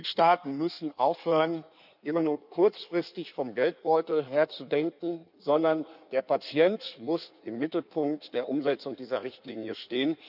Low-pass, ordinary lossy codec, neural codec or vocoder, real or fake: 5.4 kHz; none; codec, 16 kHz in and 24 kHz out, 1.1 kbps, FireRedTTS-2 codec; fake